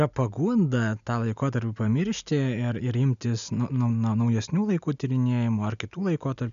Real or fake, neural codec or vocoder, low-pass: real; none; 7.2 kHz